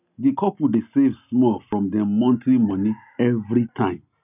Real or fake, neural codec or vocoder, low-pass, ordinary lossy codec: real; none; 3.6 kHz; none